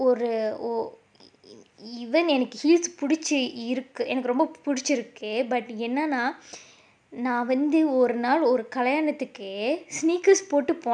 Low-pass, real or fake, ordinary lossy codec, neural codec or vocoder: 9.9 kHz; real; none; none